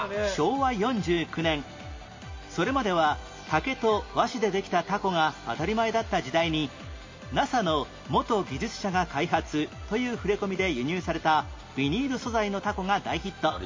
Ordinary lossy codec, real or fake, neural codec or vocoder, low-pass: MP3, 32 kbps; real; none; 7.2 kHz